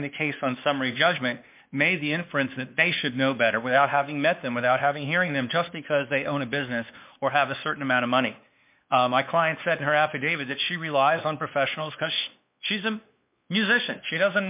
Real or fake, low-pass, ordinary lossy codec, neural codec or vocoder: fake; 3.6 kHz; MP3, 32 kbps; codec, 16 kHz, 2 kbps, X-Codec, WavLM features, trained on Multilingual LibriSpeech